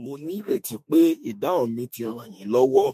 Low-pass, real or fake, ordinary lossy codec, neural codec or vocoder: 14.4 kHz; fake; MP3, 64 kbps; autoencoder, 48 kHz, 32 numbers a frame, DAC-VAE, trained on Japanese speech